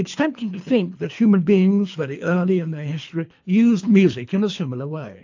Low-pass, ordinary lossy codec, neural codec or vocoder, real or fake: 7.2 kHz; AAC, 48 kbps; codec, 24 kHz, 3 kbps, HILCodec; fake